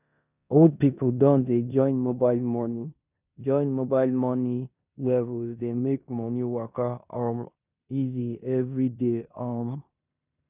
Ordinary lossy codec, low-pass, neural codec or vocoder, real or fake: none; 3.6 kHz; codec, 16 kHz in and 24 kHz out, 0.9 kbps, LongCat-Audio-Codec, four codebook decoder; fake